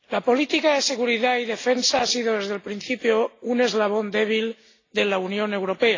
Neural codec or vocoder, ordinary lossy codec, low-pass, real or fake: none; AAC, 32 kbps; 7.2 kHz; real